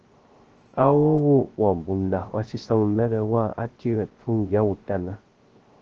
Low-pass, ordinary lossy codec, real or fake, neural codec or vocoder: 7.2 kHz; Opus, 16 kbps; fake; codec, 16 kHz, 0.3 kbps, FocalCodec